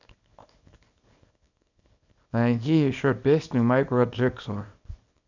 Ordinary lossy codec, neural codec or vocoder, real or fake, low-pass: none; codec, 24 kHz, 0.9 kbps, WavTokenizer, small release; fake; 7.2 kHz